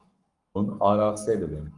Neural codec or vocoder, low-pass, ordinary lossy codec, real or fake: codec, 44.1 kHz, 7.8 kbps, Pupu-Codec; 10.8 kHz; Opus, 32 kbps; fake